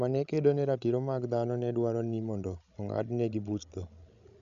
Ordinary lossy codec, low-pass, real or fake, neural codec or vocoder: none; 7.2 kHz; fake; codec, 16 kHz, 16 kbps, FreqCodec, larger model